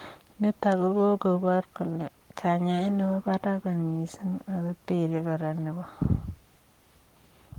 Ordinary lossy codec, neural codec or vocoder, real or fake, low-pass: Opus, 24 kbps; codec, 44.1 kHz, 7.8 kbps, Pupu-Codec; fake; 19.8 kHz